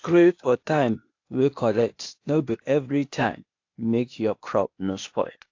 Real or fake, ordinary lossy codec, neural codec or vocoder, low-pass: fake; AAC, 48 kbps; codec, 16 kHz, 0.8 kbps, ZipCodec; 7.2 kHz